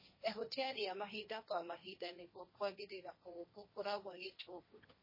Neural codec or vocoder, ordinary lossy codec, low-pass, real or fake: codec, 16 kHz, 1.1 kbps, Voila-Tokenizer; MP3, 24 kbps; 5.4 kHz; fake